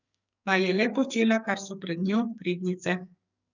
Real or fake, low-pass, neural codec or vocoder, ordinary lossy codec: fake; 7.2 kHz; codec, 44.1 kHz, 2.6 kbps, SNAC; none